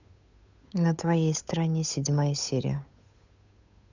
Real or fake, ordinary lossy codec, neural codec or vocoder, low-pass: fake; none; codec, 16 kHz, 8 kbps, FunCodec, trained on Chinese and English, 25 frames a second; 7.2 kHz